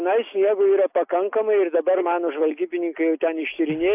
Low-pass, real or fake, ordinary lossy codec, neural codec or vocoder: 3.6 kHz; real; AAC, 32 kbps; none